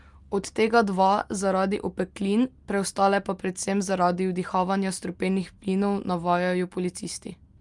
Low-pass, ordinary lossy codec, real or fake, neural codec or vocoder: 10.8 kHz; Opus, 32 kbps; real; none